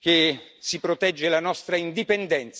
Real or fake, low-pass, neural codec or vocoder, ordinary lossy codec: real; none; none; none